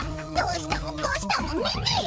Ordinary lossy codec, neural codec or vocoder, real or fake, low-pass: none; codec, 16 kHz, 4 kbps, FreqCodec, larger model; fake; none